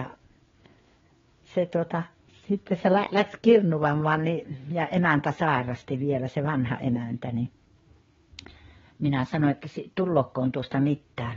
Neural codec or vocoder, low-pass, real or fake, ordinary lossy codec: codec, 16 kHz, 4 kbps, FreqCodec, larger model; 7.2 kHz; fake; AAC, 24 kbps